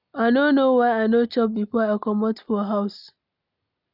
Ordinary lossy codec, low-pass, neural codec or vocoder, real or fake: none; 5.4 kHz; none; real